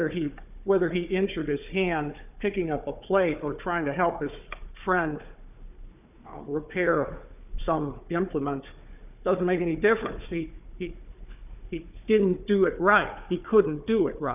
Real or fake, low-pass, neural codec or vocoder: fake; 3.6 kHz; codec, 16 kHz, 4 kbps, FunCodec, trained on Chinese and English, 50 frames a second